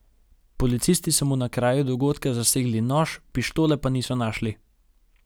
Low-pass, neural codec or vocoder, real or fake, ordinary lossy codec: none; none; real; none